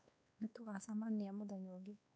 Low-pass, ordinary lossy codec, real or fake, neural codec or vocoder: none; none; fake; codec, 16 kHz, 2 kbps, X-Codec, WavLM features, trained on Multilingual LibriSpeech